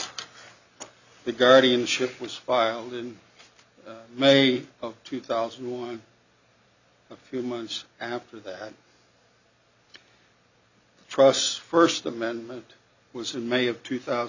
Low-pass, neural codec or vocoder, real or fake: 7.2 kHz; none; real